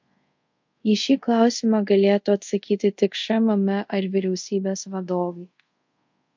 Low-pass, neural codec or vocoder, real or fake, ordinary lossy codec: 7.2 kHz; codec, 24 kHz, 0.5 kbps, DualCodec; fake; MP3, 48 kbps